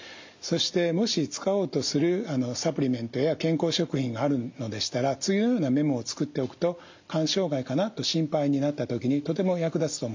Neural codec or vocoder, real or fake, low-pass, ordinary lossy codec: none; real; 7.2 kHz; MP3, 48 kbps